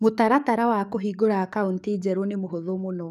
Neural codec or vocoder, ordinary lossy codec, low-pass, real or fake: codec, 44.1 kHz, 7.8 kbps, DAC; none; 14.4 kHz; fake